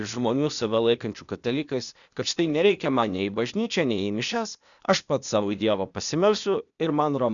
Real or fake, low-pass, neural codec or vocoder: fake; 7.2 kHz; codec, 16 kHz, 0.8 kbps, ZipCodec